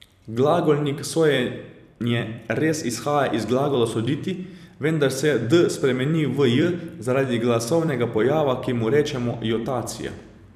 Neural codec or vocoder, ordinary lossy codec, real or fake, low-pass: vocoder, 44.1 kHz, 128 mel bands every 256 samples, BigVGAN v2; none; fake; 14.4 kHz